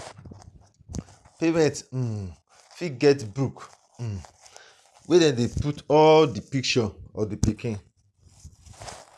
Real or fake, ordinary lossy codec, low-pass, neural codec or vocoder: real; none; none; none